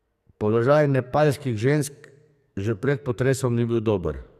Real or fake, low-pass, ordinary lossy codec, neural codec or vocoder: fake; 14.4 kHz; none; codec, 44.1 kHz, 2.6 kbps, SNAC